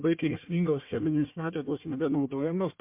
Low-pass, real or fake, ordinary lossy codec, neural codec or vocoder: 3.6 kHz; fake; MP3, 32 kbps; codec, 16 kHz, 1 kbps, FunCodec, trained on Chinese and English, 50 frames a second